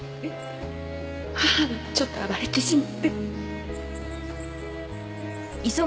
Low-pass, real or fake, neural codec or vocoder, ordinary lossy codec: none; real; none; none